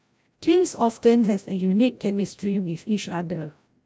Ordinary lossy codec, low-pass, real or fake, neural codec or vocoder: none; none; fake; codec, 16 kHz, 0.5 kbps, FreqCodec, larger model